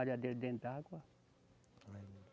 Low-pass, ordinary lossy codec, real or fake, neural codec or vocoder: none; none; real; none